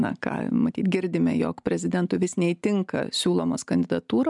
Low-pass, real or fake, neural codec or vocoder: 10.8 kHz; real; none